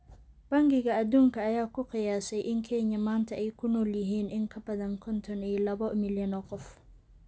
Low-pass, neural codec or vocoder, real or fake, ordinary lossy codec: none; none; real; none